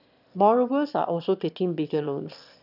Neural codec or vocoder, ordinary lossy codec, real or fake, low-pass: autoencoder, 22.05 kHz, a latent of 192 numbers a frame, VITS, trained on one speaker; none; fake; 5.4 kHz